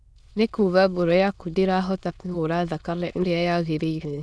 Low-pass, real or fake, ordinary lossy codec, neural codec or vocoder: none; fake; none; autoencoder, 22.05 kHz, a latent of 192 numbers a frame, VITS, trained on many speakers